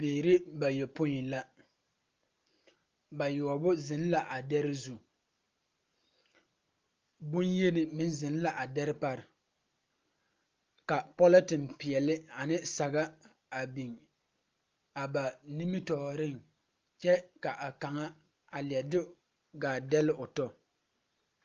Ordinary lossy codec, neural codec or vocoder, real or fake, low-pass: Opus, 24 kbps; none; real; 7.2 kHz